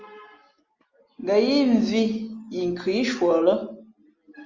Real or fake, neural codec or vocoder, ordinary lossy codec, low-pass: real; none; Opus, 32 kbps; 7.2 kHz